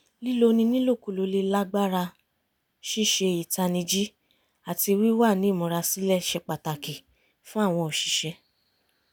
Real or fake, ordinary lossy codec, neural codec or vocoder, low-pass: real; none; none; none